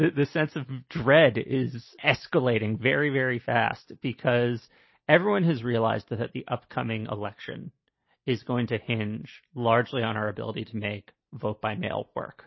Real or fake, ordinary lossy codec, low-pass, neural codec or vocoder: real; MP3, 24 kbps; 7.2 kHz; none